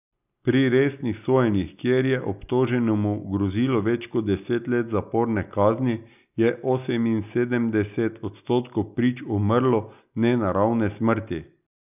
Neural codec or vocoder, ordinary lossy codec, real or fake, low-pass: none; none; real; 3.6 kHz